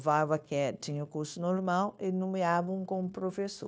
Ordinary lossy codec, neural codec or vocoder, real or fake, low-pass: none; codec, 16 kHz, 0.9 kbps, LongCat-Audio-Codec; fake; none